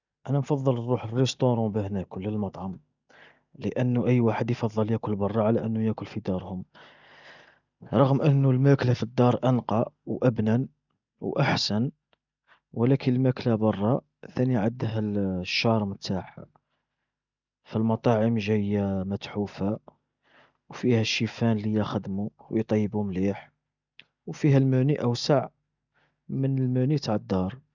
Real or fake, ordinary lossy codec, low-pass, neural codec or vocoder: real; none; 7.2 kHz; none